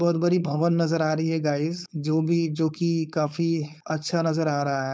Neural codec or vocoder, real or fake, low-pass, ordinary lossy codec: codec, 16 kHz, 4.8 kbps, FACodec; fake; none; none